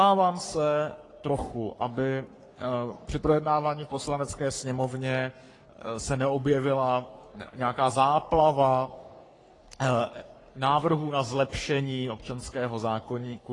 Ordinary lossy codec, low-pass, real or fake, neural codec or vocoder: AAC, 32 kbps; 10.8 kHz; fake; codec, 44.1 kHz, 3.4 kbps, Pupu-Codec